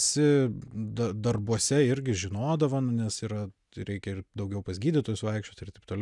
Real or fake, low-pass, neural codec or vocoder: real; 10.8 kHz; none